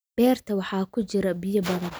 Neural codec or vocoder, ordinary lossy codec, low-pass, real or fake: none; none; none; real